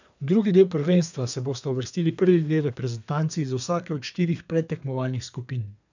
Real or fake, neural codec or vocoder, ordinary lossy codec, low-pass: fake; codec, 32 kHz, 1.9 kbps, SNAC; none; 7.2 kHz